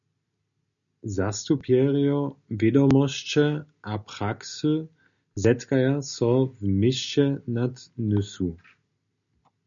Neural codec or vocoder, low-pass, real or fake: none; 7.2 kHz; real